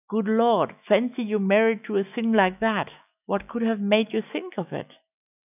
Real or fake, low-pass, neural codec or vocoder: fake; 3.6 kHz; autoencoder, 48 kHz, 128 numbers a frame, DAC-VAE, trained on Japanese speech